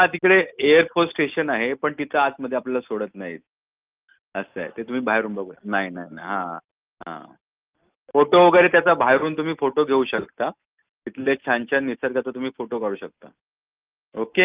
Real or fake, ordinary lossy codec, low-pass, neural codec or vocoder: real; Opus, 32 kbps; 3.6 kHz; none